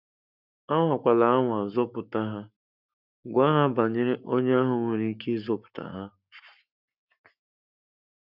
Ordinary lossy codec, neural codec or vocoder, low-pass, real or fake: none; codec, 44.1 kHz, 7.8 kbps, Pupu-Codec; 5.4 kHz; fake